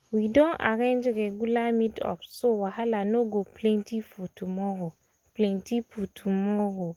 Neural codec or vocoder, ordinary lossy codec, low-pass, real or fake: none; Opus, 24 kbps; 19.8 kHz; real